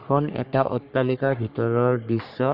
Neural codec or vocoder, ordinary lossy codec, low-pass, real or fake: codec, 44.1 kHz, 3.4 kbps, Pupu-Codec; Opus, 64 kbps; 5.4 kHz; fake